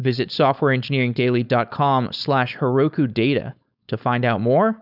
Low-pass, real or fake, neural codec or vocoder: 5.4 kHz; fake; codec, 16 kHz, 4.8 kbps, FACodec